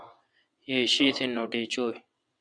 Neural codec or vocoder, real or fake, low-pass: vocoder, 22.05 kHz, 80 mel bands, WaveNeXt; fake; 9.9 kHz